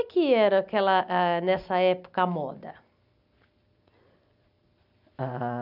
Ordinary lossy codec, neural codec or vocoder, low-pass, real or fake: none; none; 5.4 kHz; real